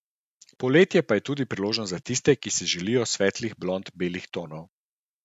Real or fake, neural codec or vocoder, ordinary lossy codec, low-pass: real; none; none; 14.4 kHz